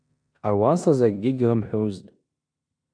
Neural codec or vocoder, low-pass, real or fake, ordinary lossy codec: codec, 16 kHz in and 24 kHz out, 0.9 kbps, LongCat-Audio-Codec, four codebook decoder; 9.9 kHz; fake; AAC, 64 kbps